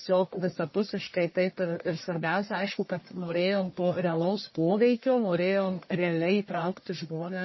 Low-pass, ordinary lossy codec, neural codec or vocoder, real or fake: 7.2 kHz; MP3, 24 kbps; codec, 44.1 kHz, 1.7 kbps, Pupu-Codec; fake